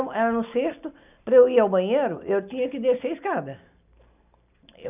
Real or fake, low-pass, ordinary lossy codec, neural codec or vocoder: real; 3.6 kHz; none; none